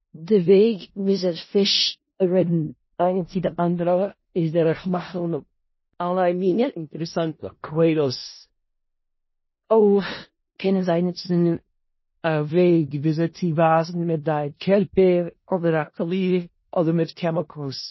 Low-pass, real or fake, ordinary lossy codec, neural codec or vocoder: 7.2 kHz; fake; MP3, 24 kbps; codec, 16 kHz in and 24 kHz out, 0.4 kbps, LongCat-Audio-Codec, four codebook decoder